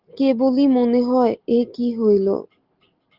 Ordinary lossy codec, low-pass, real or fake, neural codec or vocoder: Opus, 32 kbps; 5.4 kHz; real; none